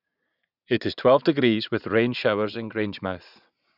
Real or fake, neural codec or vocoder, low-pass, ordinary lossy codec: fake; vocoder, 44.1 kHz, 80 mel bands, Vocos; 5.4 kHz; none